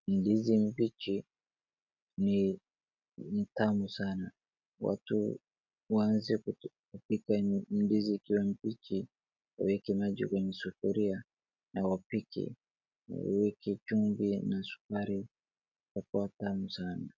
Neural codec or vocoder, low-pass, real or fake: none; 7.2 kHz; real